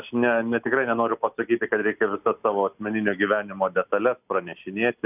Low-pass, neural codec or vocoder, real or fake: 3.6 kHz; none; real